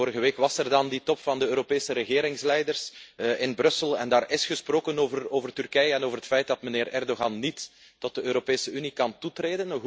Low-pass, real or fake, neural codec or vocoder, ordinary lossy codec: none; real; none; none